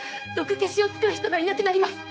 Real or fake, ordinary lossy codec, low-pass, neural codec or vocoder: fake; none; none; codec, 16 kHz, 4 kbps, X-Codec, HuBERT features, trained on general audio